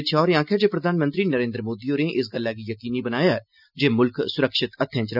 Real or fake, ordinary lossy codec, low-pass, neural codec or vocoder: real; none; 5.4 kHz; none